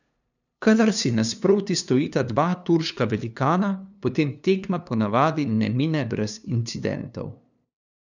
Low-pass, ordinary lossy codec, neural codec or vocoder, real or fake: 7.2 kHz; none; codec, 16 kHz, 2 kbps, FunCodec, trained on LibriTTS, 25 frames a second; fake